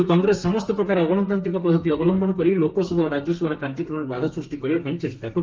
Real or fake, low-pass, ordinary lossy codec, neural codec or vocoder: fake; 7.2 kHz; Opus, 32 kbps; codec, 32 kHz, 1.9 kbps, SNAC